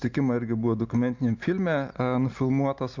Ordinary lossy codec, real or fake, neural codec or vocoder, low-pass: MP3, 64 kbps; real; none; 7.2 kHz